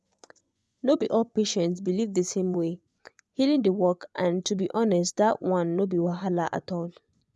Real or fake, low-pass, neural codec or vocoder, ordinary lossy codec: fake; none; vocoder, 24 kHz, 100 mel bands, Vocos; none